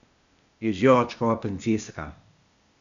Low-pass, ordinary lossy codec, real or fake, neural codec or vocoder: 7.2 kHz; none; fake; codec, 16 kHz, 0.8 kbps, ZipCodec